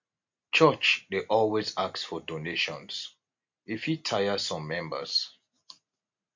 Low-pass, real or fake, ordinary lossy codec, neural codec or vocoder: 7.2 kHz; real; MP3, 48 kbps; none